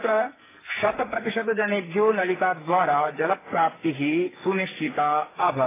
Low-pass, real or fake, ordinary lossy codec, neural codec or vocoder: 3.6 kHz; fake; AAC, 16 kbps; codec, 44.1 kHz, 2.6 kbps, SNAC